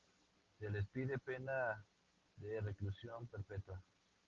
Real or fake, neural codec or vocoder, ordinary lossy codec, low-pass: real; none; Opus, 16 kbps; 7.2 kHz